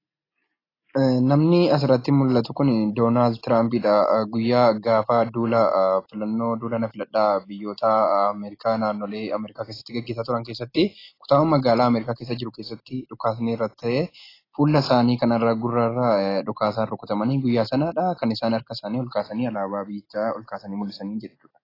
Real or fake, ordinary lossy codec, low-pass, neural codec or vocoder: real; AAC, 24 kbps; 5.4 kHz; none